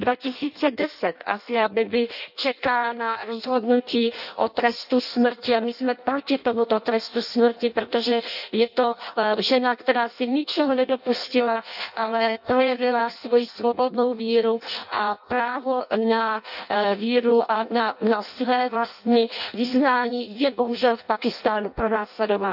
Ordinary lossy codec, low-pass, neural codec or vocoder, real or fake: none; 5.4 kHz; codec, 16 kHz in and 24 kHz out, 0.6 kbps, FireRedTTS-2 codec; fake